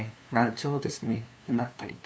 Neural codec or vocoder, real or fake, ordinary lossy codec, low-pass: codec, 16 kHz, 2 kbps, FunCodec, trained on LibriTTS, 25 frames a second; fake; none; none